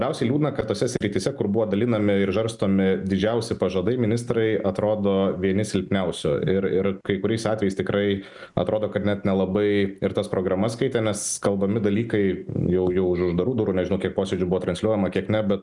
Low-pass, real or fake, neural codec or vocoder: 10.8 kHz; real; none